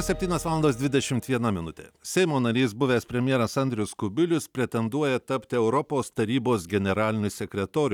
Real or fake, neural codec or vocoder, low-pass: real; none; 19.8 kHz